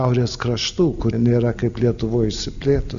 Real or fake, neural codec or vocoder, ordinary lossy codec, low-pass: real; none; MP3, 96 kbps; 7.2 kHz